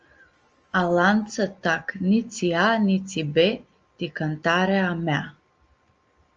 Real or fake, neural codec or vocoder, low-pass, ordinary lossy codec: real; none; 7.2 kHz; Opus, 24 kbps